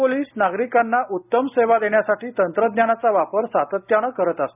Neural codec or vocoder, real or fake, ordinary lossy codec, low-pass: none; real; none; 3.6 kHz